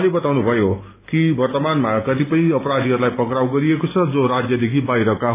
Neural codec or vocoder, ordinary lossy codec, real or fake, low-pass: none; AAC, 24 kbps; real; 3.6 kHz